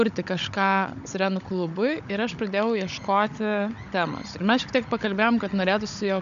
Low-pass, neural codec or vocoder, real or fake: 7.2 kHz; codec, 16 kHz, 16 kbps, FunCodec, trained on Chinese and English, 50 frames a second; fake